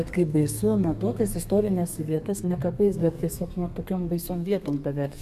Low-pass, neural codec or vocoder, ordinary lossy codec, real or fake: 14.4 kHz; codec, 32 kHz, 1.9 kbps, SNAC; AAC, 96 kbps; fake